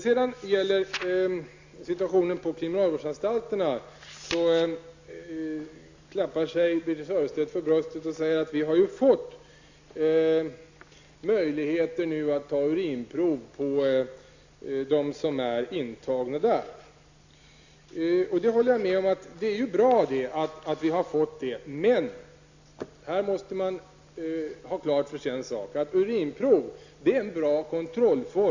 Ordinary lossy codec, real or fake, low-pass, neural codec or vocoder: Opus, 64 kbps; real; 7.2 kHz; none